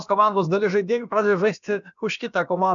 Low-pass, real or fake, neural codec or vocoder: 7.2 kHz; fake; codec, 16 kHz, about 1 kbps, DyCAST, with the encoder's durations